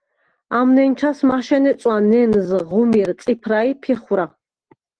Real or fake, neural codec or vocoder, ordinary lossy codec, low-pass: real; none; Opus, 16 kbps; 9.9 kHz